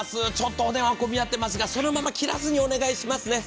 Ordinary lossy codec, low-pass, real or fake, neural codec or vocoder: none; none; real; none